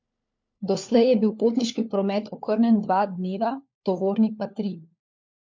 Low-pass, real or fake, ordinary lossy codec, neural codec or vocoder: 7.2 kHz; fake; MP3, 48 kbps; codec, 16 kHz, 4 kbps, FunCodec, trained on LibriTTS, 50 frames a second